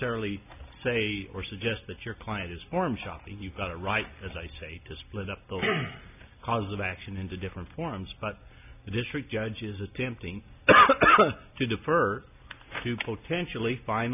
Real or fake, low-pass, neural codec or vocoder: real; 3.6 kHz; none